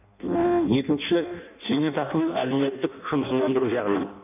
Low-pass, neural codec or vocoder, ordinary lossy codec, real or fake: 3.6 kHz; codec, 16 kHz in and 24 kHz out, 0.6 kbps, FireRedTTS-2 codec; none; fake